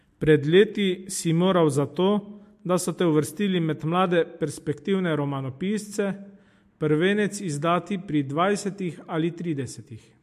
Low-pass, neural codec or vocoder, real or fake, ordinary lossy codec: 14.4 kHz; none; real; MP3, 64 kbps